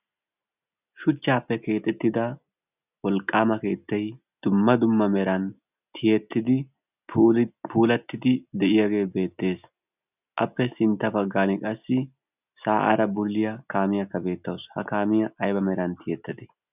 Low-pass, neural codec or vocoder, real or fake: 3.6 kHz; none; real